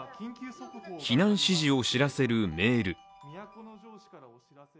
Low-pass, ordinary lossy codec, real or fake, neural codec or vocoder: none; none; real; none